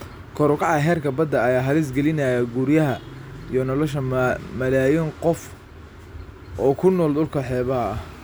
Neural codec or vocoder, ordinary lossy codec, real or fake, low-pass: none; none; real; none